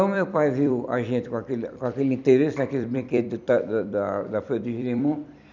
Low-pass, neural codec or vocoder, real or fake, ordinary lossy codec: 7.2 kHz; vocoder, 44.1 kHz, 128 mel bands every 256 samples, BigVGAN v2; fake; none